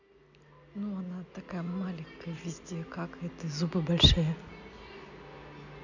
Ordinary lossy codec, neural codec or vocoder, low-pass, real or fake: none; none; 7.2 kHz; real